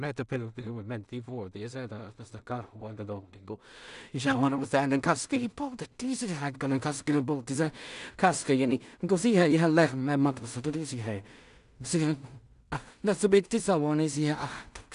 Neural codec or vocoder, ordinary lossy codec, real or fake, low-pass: codec, 16 kHz in and 24 kHz out, 0.4 kbps, LongCat-Audio-Codec, two codebook decoder; none; fake; 10.8 kHz